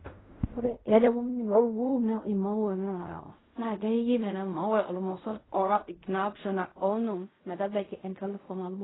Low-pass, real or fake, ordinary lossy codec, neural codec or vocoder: 7.2 kHz; fake; AAC, 16 kbps; codec, 16 kHz in and 24 kHz out, 0.4 kbps, LongCat-Audio-Codec, fine tuned four codebook decoder